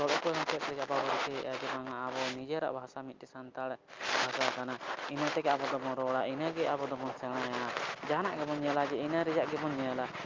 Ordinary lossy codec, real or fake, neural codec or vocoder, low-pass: Opus, 24 kbps; real; none; 7.2 kHz